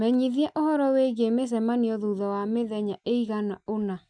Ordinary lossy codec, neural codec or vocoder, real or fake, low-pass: none; none; real; 9.9 kHz